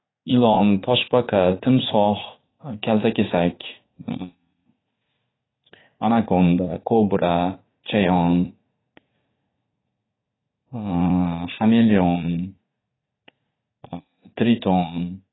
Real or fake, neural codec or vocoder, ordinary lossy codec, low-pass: fake; vocoder, 44.1 kHz, 80 mel bands, Vocos; AAC, 16 kbps; 7.2 kHz